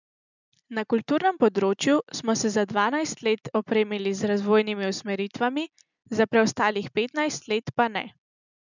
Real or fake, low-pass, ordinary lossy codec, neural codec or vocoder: real; 7.2 kHz; none; none